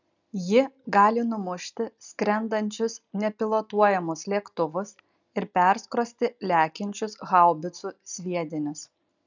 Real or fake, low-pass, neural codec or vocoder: real; 7.2 kHz; none